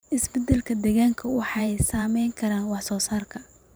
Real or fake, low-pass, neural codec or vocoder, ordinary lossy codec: fake; none; vocoder, 44.1 kHz, 128 mel bands every 512 samples, BigVGAN v2; none